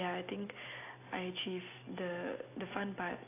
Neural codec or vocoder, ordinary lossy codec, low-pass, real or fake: none; AAC, 24 kbps; 3.6 kHz; real